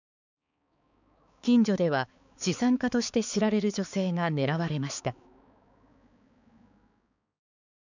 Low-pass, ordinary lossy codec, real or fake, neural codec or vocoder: 7.2 kHz; none; fake; codec, 16 kHz, 4 kbps, X-Codec, HuBERT features, trained on balanced general audio